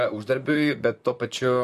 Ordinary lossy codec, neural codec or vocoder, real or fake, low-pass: MP3, 64 kbps; vocoder, 44.1 kHz, 128 mel bands, Pupu-Vocoder; fake; 14.4 kHz